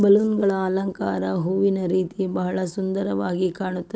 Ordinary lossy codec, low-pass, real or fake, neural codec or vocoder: none; none; real; none